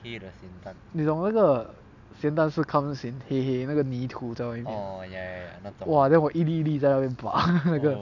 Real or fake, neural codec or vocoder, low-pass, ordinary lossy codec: real; none; 7.2 kHz; none